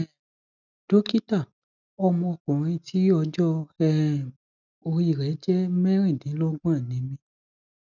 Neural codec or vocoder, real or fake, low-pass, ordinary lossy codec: none; real; 7.2 kHz; none